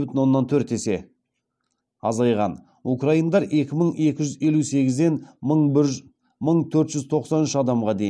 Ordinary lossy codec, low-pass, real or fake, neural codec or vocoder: none; none; real; none